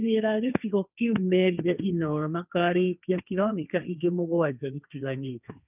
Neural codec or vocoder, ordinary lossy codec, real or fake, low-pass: codec, 16 kHz, 1.1 kbps, Voila-Tokenizer; none; fake; 3.6 kHz